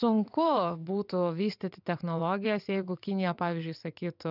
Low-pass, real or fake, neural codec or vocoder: 5.4 kHz; fake; vocoder, 22.05 kHz, 80 mel bands, WaveNeXt